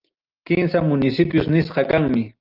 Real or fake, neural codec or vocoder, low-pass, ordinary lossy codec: real; none; 5.4 kHz; Opus, 24 kbps